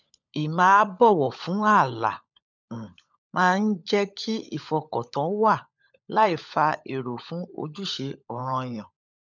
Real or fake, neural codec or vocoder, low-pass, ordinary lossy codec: fake; codec, 16 kHz, 16 kbps, FunCodec, trained on LibriTTS, 50 frames a second; 7.2 kHz; none